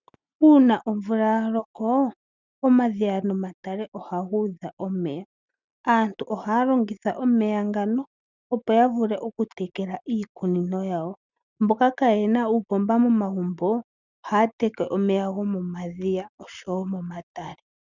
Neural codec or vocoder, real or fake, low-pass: none; real; 7.2 kHz